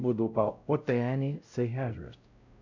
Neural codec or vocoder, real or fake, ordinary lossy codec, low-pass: codec, 16 kHz, 0.5 kbps, X-Codec, WavLM features, trained on Multilingual LibriSpeech; fake; none; 7.2 kHz